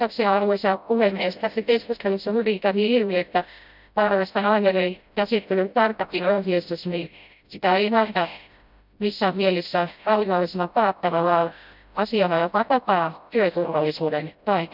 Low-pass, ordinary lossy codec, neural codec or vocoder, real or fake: 5.4 kHz; none; codec, 16 kHz, 0.5 kbps, FreqCodec, smaller model; fake